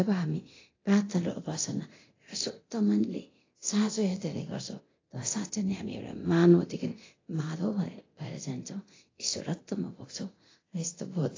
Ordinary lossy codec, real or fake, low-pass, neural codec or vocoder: AAC, 32 kbps; fake; 7.2 kHz; codec, 24 kHz, 0.9 kbps, DualCodec